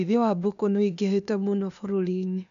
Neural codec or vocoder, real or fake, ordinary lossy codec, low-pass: codec, 16 kHz, 0.8 kbps, ZipCodec; fake; none; 7.2 kHz